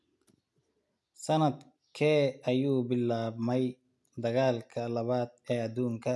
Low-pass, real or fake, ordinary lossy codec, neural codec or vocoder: none; real; none; none